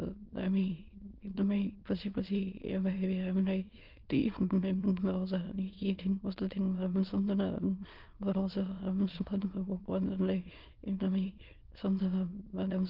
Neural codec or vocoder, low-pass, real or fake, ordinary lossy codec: autoencoder, 22.05 kHz, a latent of 192 numbers a frame, VITS, trained on many speakers; 5.4 kHz; fake; Opus, 32 kbps